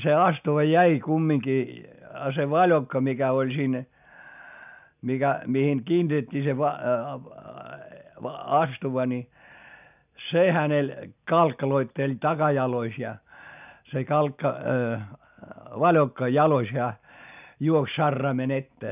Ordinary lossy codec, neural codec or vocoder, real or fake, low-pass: none; none; real; 3.6 kHz